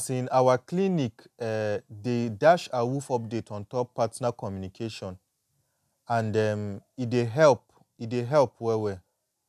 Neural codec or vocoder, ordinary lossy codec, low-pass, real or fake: none; none; 14.4 kHz; real